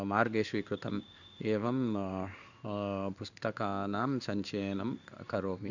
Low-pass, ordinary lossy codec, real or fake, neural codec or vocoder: 7.2 kHz; none; fake; codec, 16 kHz in and 24 kHz out, 1 kbps, XY-Tokenizer